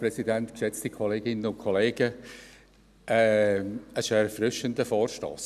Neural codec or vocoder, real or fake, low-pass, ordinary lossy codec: none; real; 14.4 kHz; none